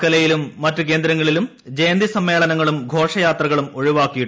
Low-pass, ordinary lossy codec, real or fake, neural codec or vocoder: none; none; real; none